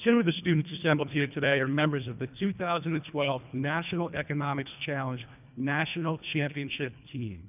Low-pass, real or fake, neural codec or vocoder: 3.6 kHz; fake; codec, 24 kHz, 1.5 kbps, HILCodec